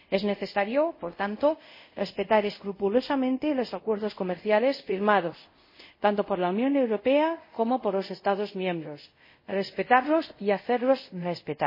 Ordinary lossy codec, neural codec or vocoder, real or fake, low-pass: MP3, 24 kbps; codec, 24 kHz, 0.5 kbps, DualCodec; fake; 5.4 kHz